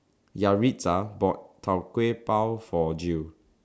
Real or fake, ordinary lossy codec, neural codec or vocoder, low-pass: real; none; none; none